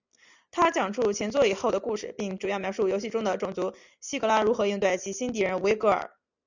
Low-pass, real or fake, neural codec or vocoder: 7.2 kHz; real; none